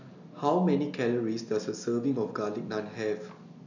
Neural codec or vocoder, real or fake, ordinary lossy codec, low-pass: none; real; none; 7.2 kHz